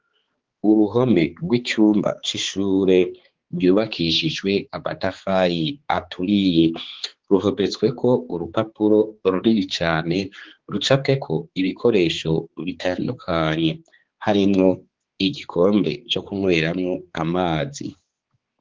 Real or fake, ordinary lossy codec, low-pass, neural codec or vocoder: fake; Opus, 16 kbps; 7.2 kHz; codec, 16 kHz, 4 kbps, X-Codec, HuBERT features, trained on balanced general audio